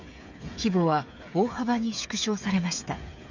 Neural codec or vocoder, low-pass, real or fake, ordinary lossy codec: codec, 16 kHz, 8 kbps, FreqCodec, smaller model; 7.2 kHz; fake; none